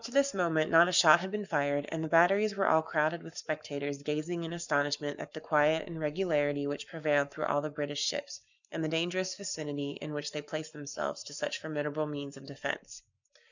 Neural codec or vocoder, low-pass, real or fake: codec, 44.1 kHz, 7.8 kbps, Pupu-Codec; 7.2 kHz; fake